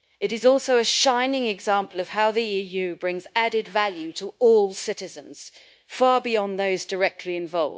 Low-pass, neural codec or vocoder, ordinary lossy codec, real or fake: none; codec, 16 kHz, 0.9 kbps, LongCat-Audio-Codec; none; fake